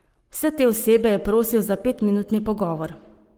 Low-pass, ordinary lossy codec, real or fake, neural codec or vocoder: 19.8 kHz; Opus, 24 kbps; fake; codec, 44.1 kHz, 7.8 kbps, Pupu-Codec